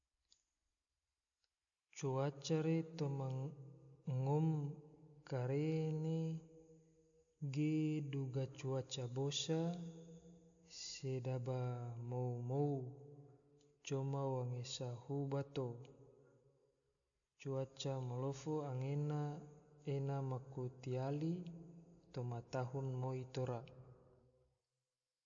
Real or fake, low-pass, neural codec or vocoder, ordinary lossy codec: real; 7.2 kHz; none; none